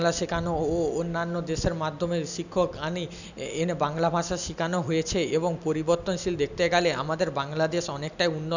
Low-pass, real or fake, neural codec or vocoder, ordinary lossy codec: 7.2 kHz; real; none; none